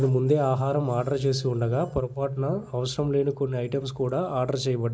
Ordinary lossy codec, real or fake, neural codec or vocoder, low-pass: none; real; none; none